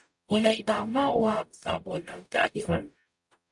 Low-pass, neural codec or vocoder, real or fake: 10.8 kHz; codec, 44.1 kHz, 0.9 kbps, DAC; fake